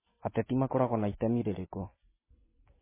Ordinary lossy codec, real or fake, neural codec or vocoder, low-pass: MP3, 16 kbps; real; none; 3.6 kHz